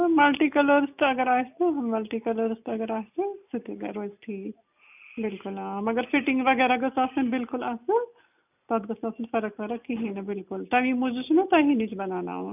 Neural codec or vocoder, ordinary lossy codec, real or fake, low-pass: none; none; real; 3.6 kHz